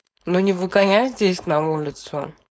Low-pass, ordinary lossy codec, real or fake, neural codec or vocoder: none; none; fake; codec, 16 kHz, 4.8 kbps, FACodec